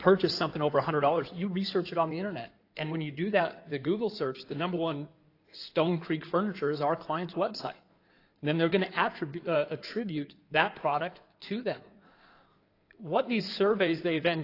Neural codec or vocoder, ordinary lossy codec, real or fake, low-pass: codec, 16 kHz in and 24 kHz out, 2.2 kbps, FireRedTTS-2 codec; AAC, 32 kbps; fake; 5.4 kHz